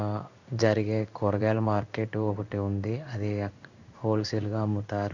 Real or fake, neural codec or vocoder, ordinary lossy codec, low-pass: fake; codec, 16 kHz in and 24 kHz out, 1 kbps, XY-Tokenizer; none; 7.2 kHz